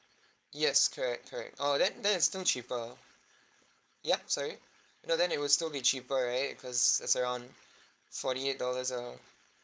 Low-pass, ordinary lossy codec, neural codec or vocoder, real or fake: none; none; codec, 16 kHz, 4.8 kbps, FACodec; fake